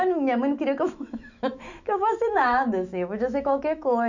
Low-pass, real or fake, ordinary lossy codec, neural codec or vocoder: 7.2 kHz; fake; none; autoencoder, 48 kHz, 128 numbers a frame, DAC-VAE, trained on Japanese speech